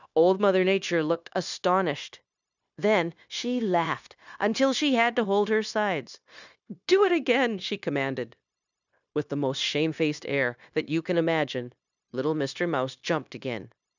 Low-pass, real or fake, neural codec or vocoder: 7.2 kHz; fake; codec, 16 kHz, 0.9 kbps, LongCat-Audio-Codec